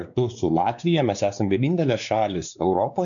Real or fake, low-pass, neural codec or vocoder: fake; 7.2 kHz; codec, 16 kHz, 2 kbps, X-Codec, WavLM features, trained on Multilingual LibriSpeech